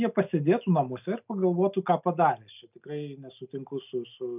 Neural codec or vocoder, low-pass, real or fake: none; 3.6 kHz; real